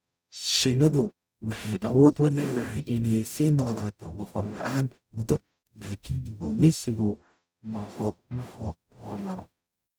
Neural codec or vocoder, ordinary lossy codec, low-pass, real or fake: codec, 44.1 kHz, 0.9 kbps, DAC; none; none; fake